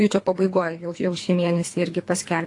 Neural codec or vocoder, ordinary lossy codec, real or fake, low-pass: codec, 24 kHz, 3 kbps, HILCodec; AAC, 48 kbps; fake; 10.8 kHz